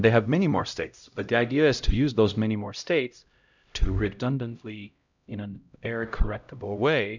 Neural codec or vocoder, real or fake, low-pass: codec, 16 kHz, 0.5 kbps, X-Codec, HuBERT features, trained on LibriSpeech; fake; 7.2 kHz